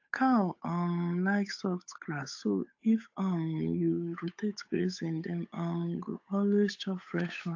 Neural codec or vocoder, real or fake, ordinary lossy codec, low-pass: codec, 16 kHz, 8 kbps, FunCodec, trained on Chinese and English, 25 frames a second; fake; AAC, 48 kbps; 7.2 kHz